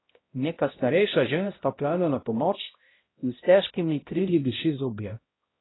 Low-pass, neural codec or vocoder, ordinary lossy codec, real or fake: 7.2 kHz; codec, 16 kHz, 0.5 kbps, X-Codec, HuBERT features, trained on balanced general audio; AAC, 16 kbps; fake